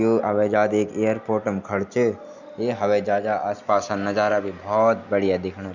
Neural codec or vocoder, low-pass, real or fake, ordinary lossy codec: none; 7.2 kHz; real; none